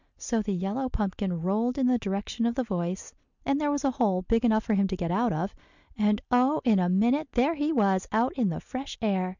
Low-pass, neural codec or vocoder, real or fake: 7.2 kHz; none; real